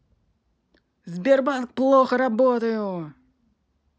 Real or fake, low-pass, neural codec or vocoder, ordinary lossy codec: real; none; none; none